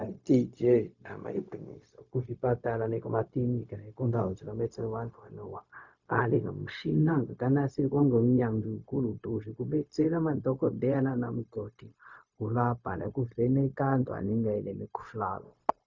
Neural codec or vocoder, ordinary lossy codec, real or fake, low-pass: codec, 16 kHz, 0.4 kbps, LongCat-Audio-Codec; Opus, 64 kbps; fake; 7.2 kHz